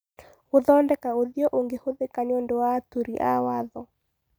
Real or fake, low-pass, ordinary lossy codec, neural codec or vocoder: real; none; none; none